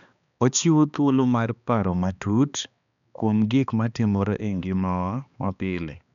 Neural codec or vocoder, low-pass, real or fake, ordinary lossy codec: codec, 16 kHz, 2 kbps, X-Codec, HuBERT features, trained on balanced general audio; 7.2 kHz; fake; none